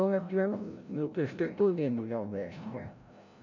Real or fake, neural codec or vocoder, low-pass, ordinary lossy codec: fake; codec, 16 kHz, 0.5 kbps, FreqCodec, larger model; 7.2 kHz; none